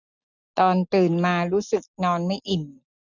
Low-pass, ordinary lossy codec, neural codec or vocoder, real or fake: 7.2 kHz; none; none; real